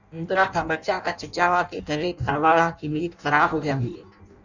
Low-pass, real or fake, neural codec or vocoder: 7.2 kHz; fake; codec, 16 kHz in and 24 kHz out, 0.6 kbps, FireRedTTS-2 codec